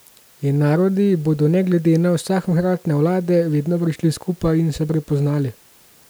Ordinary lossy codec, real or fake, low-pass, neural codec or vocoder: none; real; none; none